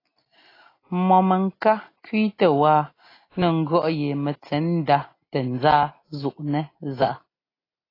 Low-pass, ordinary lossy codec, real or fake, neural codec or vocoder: 5.4 kHz; AAC, 24 kbps; real; none